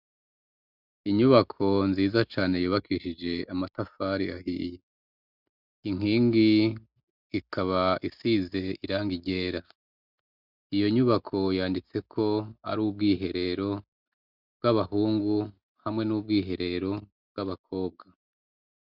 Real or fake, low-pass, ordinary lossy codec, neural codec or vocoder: real; 5.4 kHz; Opus, 64 kbps; none